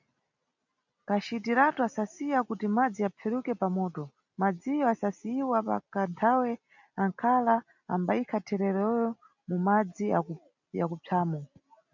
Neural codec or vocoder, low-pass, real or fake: none; 7.2 kHz; real